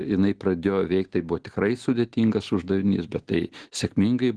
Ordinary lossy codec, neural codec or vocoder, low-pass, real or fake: Opus, 16 kbps; none; 10.8 kHz; real